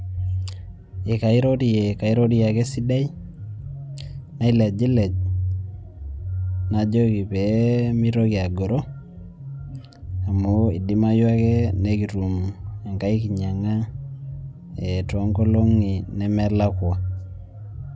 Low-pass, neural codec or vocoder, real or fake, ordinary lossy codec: none; none; real; none